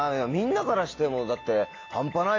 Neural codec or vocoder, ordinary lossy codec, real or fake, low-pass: none; AAC, 32 kbps; real; 7.2 kHz